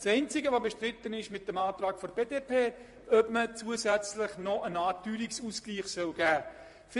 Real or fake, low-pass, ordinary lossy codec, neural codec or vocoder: fake; 14.4 kHz; MP3, 48 kbps; vocoder, 44.1 kHz, 128 mel bands, Pupu-Vocoder